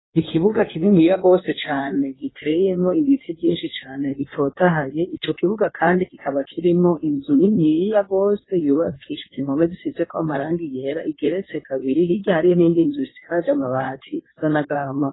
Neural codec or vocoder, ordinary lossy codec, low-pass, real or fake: codec, 16 kHz in and 24 kHz out, 1.1 kbps, FireRedTTS-2 codec; AAC, 16 kbps; 7.2 kHz; fake